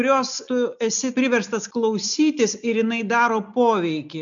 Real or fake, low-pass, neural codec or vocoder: real; 7.2 kHz; none